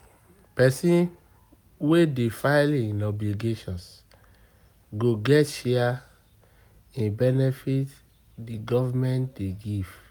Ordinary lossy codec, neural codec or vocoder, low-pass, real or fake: none; none; none; real